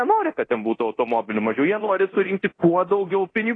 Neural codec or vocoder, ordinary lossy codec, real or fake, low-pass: codec, 24 kHz, 0.9 kbps, DualCodec; AAC, 32 kbps; fake; 9.9 kHz